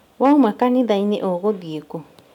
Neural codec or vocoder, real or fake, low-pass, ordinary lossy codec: none; real; 19.8 kHz; none